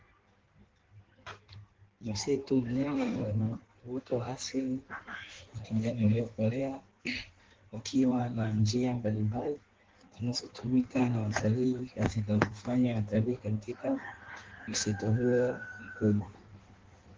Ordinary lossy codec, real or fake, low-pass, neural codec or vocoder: Opus, 16 kbps; fake; 7.2 kHz; codec, 16 kHz in and 24 kHz out, 1.1 kbps, FireRedTTS-2 codec